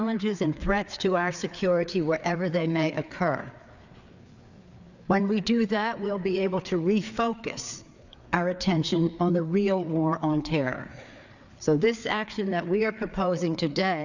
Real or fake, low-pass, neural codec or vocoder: fake; 7.2 kHz; codec, 16 kHz, 4 kbps, FreqCodec, larger model